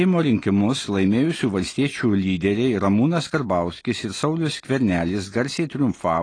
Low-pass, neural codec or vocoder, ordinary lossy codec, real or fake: 9.9 kHz; none; AAC, 32 kbps; real